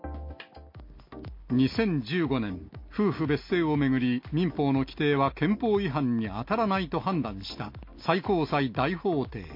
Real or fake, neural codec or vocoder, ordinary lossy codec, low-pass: real; none; MP3, 32 kbps; 5.4 kHz